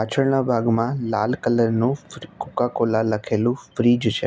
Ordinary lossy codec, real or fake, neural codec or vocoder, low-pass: none; real; none; none